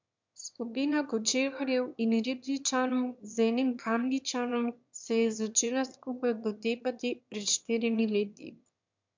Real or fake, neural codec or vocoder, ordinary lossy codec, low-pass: fake; autoencoder, 22.05 kHz, a latent of 192 numbers a frame, VITS, trained on one speaker; MP3, 64 kbps; 7.2 kHz